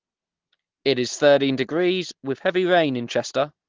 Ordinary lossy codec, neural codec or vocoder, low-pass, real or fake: Opus, 16 kbps; none; 7.2 kHz; real